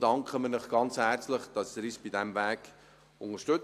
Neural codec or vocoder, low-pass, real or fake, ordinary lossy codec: none; 14.4 kHz; real; AAC, 64 kbps